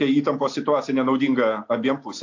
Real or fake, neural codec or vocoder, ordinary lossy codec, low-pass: real; none; AAC, 48 kbps; 7.2 kHz